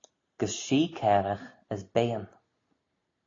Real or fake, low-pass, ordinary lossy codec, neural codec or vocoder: real; 7.2 kHz; AAC, 32 kbps; none